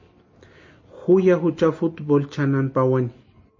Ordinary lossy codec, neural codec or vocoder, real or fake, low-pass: MP3, 32 kbps; none; real; 7.2 kHz